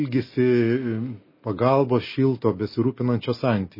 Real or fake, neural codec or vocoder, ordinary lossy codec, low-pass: real; none; MP3, 24 kbps; 5.4 kHz